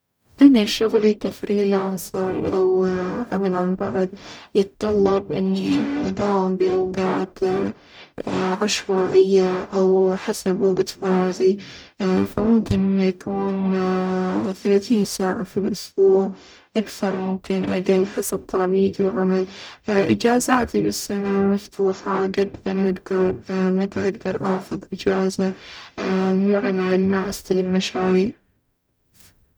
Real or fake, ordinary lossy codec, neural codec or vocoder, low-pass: fake; none; codec, 44.1 kHz, 0.9 kbps, DAC; none